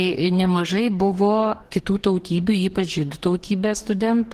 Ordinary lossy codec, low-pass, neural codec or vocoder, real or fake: Opus, 16 kbps; 14.4 kHz; codec, 44.1 kHz, 2.6 kbps, DAC; fake